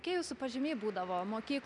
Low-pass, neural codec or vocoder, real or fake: 10.8 kHz; none; real